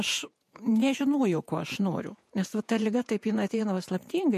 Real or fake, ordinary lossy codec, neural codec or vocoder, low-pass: fake; MP3, 64 kbps; vocoder, 48 kHz, 128 mel bands, Vocos; 14.4 kHz